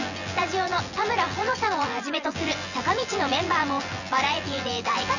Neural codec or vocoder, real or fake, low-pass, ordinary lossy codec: vocoder, 24 kHz, 100 mel bands, Vocos; fake; 7.2 kHz; none